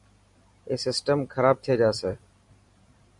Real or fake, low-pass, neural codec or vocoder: fake; 10.8 kHz; vocoder, 44.1 kHz, 128 mel bands every 512 samples, BigVGAN v2